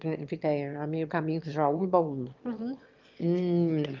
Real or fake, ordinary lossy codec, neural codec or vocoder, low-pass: fake; Opus, 24 kbps; autoencoder, 22.05 kHz, a latent of 192 numbers a frame, VITS, trained on one speaker; 7.2 kHz